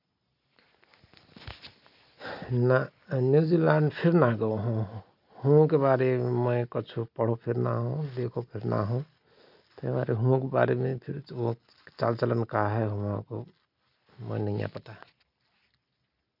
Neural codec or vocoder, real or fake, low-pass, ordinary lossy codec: none; real; 5.4 kHz; none